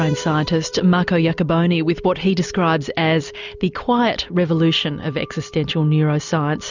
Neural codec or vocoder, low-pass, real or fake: none; 7.2 kHz; real